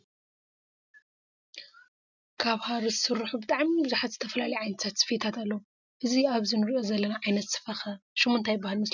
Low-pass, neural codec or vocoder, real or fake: 7.2 kHz; none; real